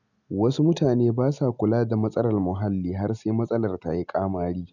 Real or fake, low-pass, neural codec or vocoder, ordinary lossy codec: real; 7.2 kHz; none; none